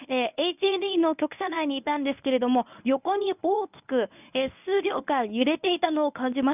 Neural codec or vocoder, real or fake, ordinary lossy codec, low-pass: codec, 24 kHz, 0.9 kbps, WavTokenizer, medium speech release version 1; fake; none; 3.6 kHz